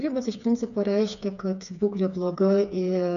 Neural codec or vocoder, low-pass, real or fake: codec, 16 kHz, 4 kbps, FreqCodec, smaller model; 7.2 kHz; fake